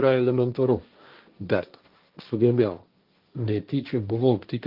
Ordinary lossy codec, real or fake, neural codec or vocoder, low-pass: Opus, 32 kbps; fake; codec, 16 kHz, 1.1 kbps, Voila-Tokenizer; 5.4 kHz